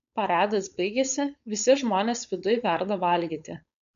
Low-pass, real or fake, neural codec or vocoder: 7.2 kHz; fake; codec, 16 kHz, 4.8 kbps, FACodec